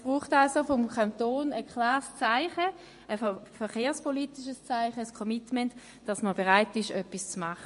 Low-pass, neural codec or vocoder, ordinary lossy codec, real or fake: 10.8 kHz; vocoder, 24 kHz, 100 mel bands, Vocos; MP3, 48 kbps; fake